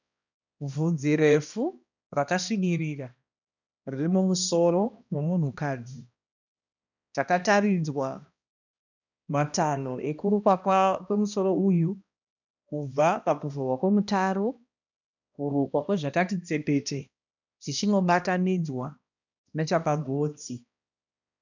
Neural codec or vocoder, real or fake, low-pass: codec, 16 kHz, 1 kbps, X-Codec, HuBERT features, trained on balanced general audio; fake; 7.2 kHz